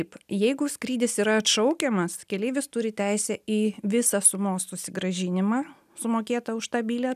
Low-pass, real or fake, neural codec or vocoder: 14.4 kHz; real; none